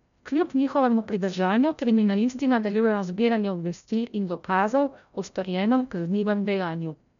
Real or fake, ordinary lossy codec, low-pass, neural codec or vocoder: fake; none; 7.2 kHz; codec, 16 kHz, 0.5 kbps, FreqCodec, larger model